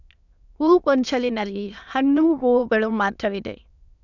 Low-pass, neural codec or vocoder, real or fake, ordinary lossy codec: 7.2 kHz; autoencoder, 22.05 kHz, a latent of 192 numbers a frame, VITS, trained on many speakers; fake; none